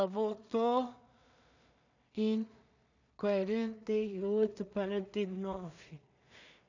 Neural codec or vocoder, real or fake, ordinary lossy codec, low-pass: codec, 16 kHz in and 24 kHz out, 0.4 kbps, LongCat-Audio-Codec, two codebook decoder; fake; none; 7.2 kHz